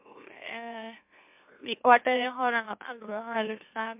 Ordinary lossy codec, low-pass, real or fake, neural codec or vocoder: MP3, 32 kbps; 3.6 kHz; fake; autoencoder, 44.1 kHz, a latent of 192 numbers a frame, MeloTTS